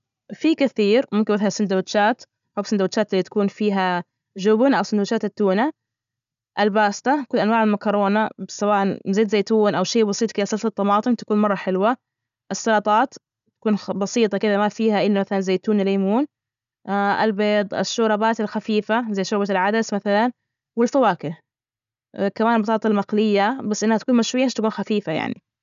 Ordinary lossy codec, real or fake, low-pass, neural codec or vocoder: MP3, 96 kbps; real; 7.2 kHz; none